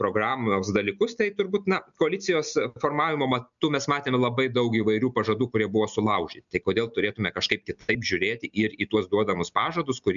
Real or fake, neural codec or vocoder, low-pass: real; none; 7.2 kHz